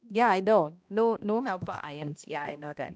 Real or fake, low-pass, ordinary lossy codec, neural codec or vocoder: fake; none; none; codec, 16 kHz, 1 kbps, X-Codec, HuBERT features, trained on balanced general audio